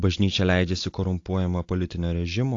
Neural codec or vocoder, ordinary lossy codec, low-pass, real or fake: none; AAC, 48 kbps; 7.2 kHz; real